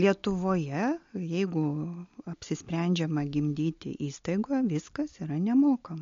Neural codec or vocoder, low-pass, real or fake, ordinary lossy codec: none; 7.2 kHz; real; MP3, 48 kbps